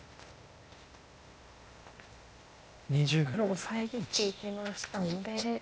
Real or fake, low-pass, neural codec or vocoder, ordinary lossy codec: fake; none; codec, 16 kHz, 0.8 kbps, ZipCodec; none